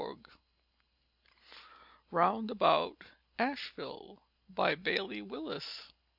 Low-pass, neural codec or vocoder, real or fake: 5.4 kHz; none; real